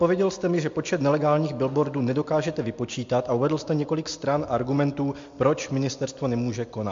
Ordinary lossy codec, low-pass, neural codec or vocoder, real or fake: MP3, 48 kbps; 7.2 kHz; none; real